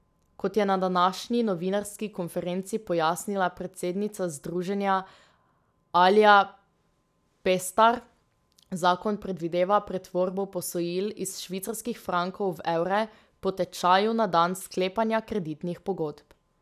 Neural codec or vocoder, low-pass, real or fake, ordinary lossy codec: none; 14.4 kHz; real; none